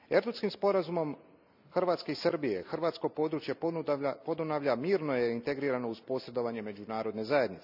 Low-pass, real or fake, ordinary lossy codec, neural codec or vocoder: 5.4 kHz; real; none; none